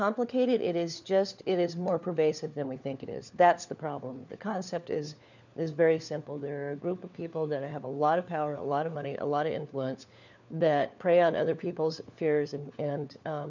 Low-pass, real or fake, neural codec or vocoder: 7.2 kHz; fake; codec, 16 kHz, 4 kbps, FunCodec, trained on LibriTTS, 50 frames a second